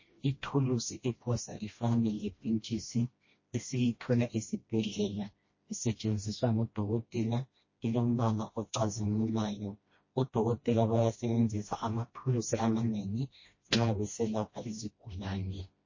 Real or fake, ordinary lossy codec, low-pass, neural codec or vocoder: fake; MP3, 32 kbps; 7.2 kHz; codec, 16 kHz, 1 kbps, FreqCodec, smaller model